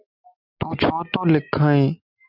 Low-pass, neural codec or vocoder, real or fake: 5.4 kHz; none; real